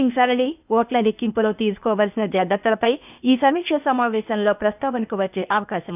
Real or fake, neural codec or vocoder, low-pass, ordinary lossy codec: fake; codec, 16 kHz, 0.8 kbps, ZipCodec; 3.6 kHz; none